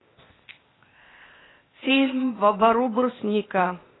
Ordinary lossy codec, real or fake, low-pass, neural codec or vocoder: AAC, 16 kbps; fake; 7.2 kHz; codec, 16 kHz, 0.8 kbps, ZipCodec